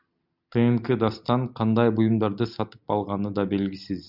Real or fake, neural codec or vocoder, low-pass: real; none; 5.4 kHz